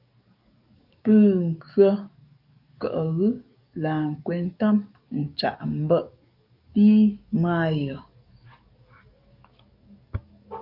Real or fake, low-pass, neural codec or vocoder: fake; 5.4 kHz; codec, 44.1 kHz, 7.8 kbps, DAC